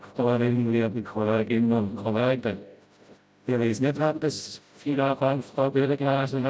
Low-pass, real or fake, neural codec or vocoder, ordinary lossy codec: none; fake; codec, 16 kHz, 0.5 kbps, FreqCodec, smaller model; none